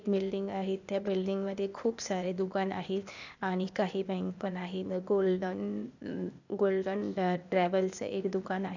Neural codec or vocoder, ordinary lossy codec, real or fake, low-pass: codec, 16 kHz, 0.8 kbps, ZipCodec; none; fake; 7.2 kHz